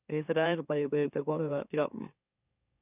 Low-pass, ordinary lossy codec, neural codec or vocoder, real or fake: 3.6 kHz; none; autoencoder, 44.1 kHz, a latent of 192 numbers a frame, MeloTTS; fake